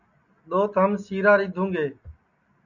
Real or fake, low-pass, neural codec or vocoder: real; 7.2 kHz; none